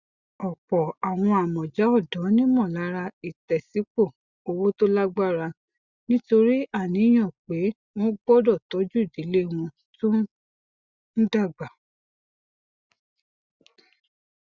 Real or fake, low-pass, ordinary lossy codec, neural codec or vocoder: real; 7.2 kHz; Opus, 64 kbps; none